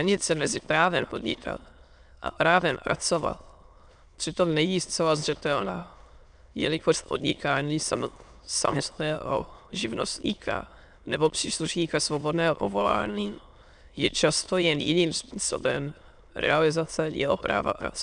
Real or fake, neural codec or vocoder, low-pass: fake; autoencoder, 22.05 kHz, a latent of 192 numbers a frame, VITS, trained on many speakers; 9.9 kHz